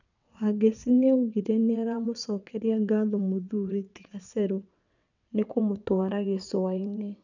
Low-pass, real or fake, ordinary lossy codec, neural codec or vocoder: 7.2 kHz; fake; none; vocoder, 22.05 kHz, 80 mel bands, WaveNeXt